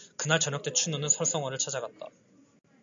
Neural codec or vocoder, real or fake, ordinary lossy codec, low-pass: none; real; MP3, 64 kbps; 7.2 kHz